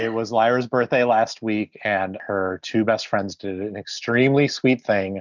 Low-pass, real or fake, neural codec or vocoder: 7.2 kHz; real; none